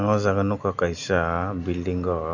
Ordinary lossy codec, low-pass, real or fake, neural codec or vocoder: none; 7.2 kHz; real; none